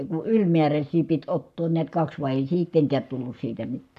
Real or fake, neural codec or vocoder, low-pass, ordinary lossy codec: fake; codec, 44.1 kHz, 7.8 kbps, Pupu-Codec; 14.4 kHz; none